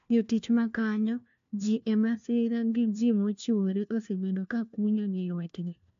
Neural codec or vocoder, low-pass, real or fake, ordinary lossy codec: codec, 16 kHz, 1 kbps, FunCodec, trained on LibriTTS, 50 frames a second; 7.2 kHz; fake; AAC, 64 kbps